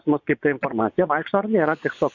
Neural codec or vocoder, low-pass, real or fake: vocoder, 24 kHz, 100 mel bands, Vocos; 7.2 kHz; fake